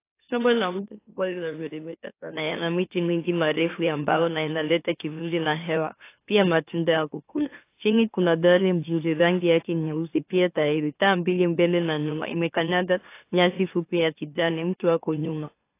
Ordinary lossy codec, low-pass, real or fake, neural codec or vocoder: AAC, 24 kbps; 3.6 kHz; fake; autoencoder, 44.1 kHz, a latent of 192 numbers a frame, MeloTTS